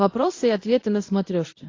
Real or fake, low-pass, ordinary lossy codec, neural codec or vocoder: fake; 7.2 kHz; AAC, 32 kbps; codec, 24 kHz, 0.9 kbps, WavTokenizer, medium speech release version 1